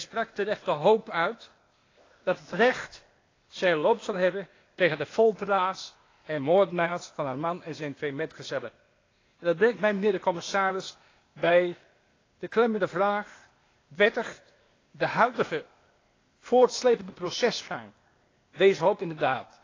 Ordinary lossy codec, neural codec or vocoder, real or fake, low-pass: AAC, 32 kbps; codec, 16 kHz, 0.8 kbps, ZipCodec; fake; 7.2 kHz